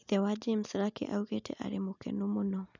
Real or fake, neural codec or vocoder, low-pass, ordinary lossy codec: real; none; 7.2 kHz; none